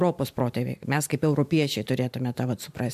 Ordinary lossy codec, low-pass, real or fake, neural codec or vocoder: MP3, 96 kbps; 14.4 kHz; fake; vocoder, 44.1 kHz, 128 mel bands every 512 samples, BigVGAN v2